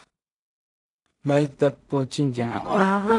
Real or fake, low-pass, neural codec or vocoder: fake; 10.8 kHz; codec, 16 kHz in and 24 kHz out, 0.4 kbps, LongCat-Audio-Codec, two codebook decoder